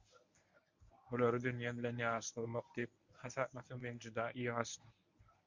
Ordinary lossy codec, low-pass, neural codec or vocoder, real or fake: MP3, 64 kbps; 7.2 kHz; codec, 24 kHz, 0.9 kbps, WavTokenizer, medium speech release version 1; fake